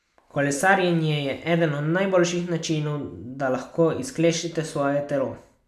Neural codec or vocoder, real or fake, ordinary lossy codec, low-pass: none; real; none; 14.4 kHz